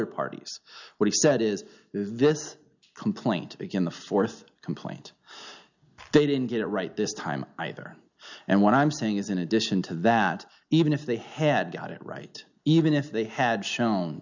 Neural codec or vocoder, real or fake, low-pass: none; real; 7.2 kHz